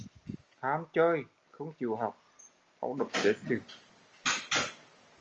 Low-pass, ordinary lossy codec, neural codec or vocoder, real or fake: 7.2 kHz; Opus, 24 kbps; none; real